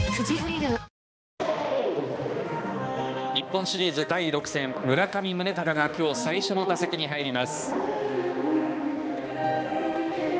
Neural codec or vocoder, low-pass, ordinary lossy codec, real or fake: codec, 16 kHz, 2 kbps, X-Codec, HuBERT features, trained on balanced general audio; none; none; fake